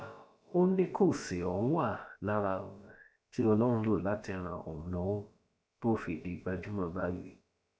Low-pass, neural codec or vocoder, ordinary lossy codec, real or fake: none; codec, 16 kHz, about 1 kbps, DyCAST, with the encoder's durations; none; fake